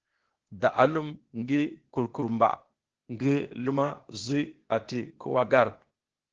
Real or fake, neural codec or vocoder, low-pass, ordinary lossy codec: fake; codec, 16 kHz, 0.8 kbps, ZipCodec; 7.2 kHz; Opus, 16 kbps